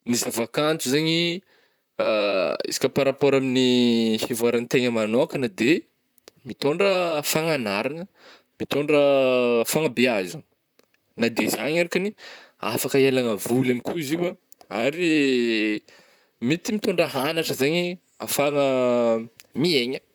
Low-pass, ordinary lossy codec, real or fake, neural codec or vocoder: none; none; fake; vocoder, 44.1 kHz, 128 mel bands, Pupu-Vocoder